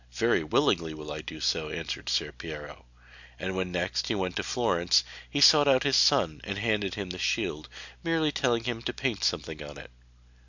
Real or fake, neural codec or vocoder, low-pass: real; none; 7.2 kHz